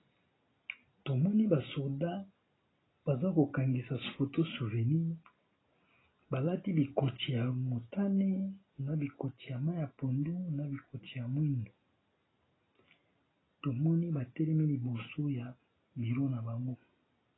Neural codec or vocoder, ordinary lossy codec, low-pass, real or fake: none; AAC, 16 kbps; 7.2 kHz; real